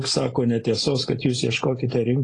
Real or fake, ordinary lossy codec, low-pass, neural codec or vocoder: real; AAC, 32 kbps; 9.9 kHz; none